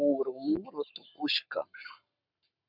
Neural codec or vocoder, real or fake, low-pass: codec, 44.1 kHz, 7.8 kbps, Pupu-Codec; fake; 5.4 kHz